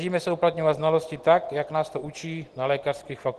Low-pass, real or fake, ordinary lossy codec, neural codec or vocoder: 10.8 kHz; real; Opus, 16 kbps; none